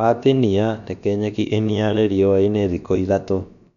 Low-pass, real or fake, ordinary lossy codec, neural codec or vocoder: 7.2 kHz; fake; none; codec, 16 kHz, about 1 kbps, DyCAST, with the encoder's durations